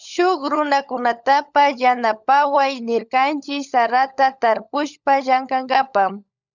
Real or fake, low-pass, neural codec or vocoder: fake; 7.2 kHz; codec, 16 kHz, 16 kbps, FunCodec, trained on LibriTTS, 50 frames a second